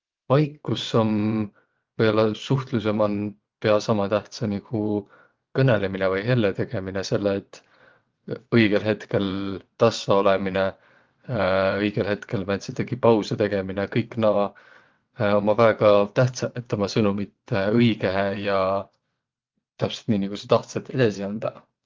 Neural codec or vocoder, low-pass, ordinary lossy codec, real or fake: vocoder, 22.05 kHz, 80 mel bands, WaveNeXt; 7.2 kHz; Opus, 32 kbps; fake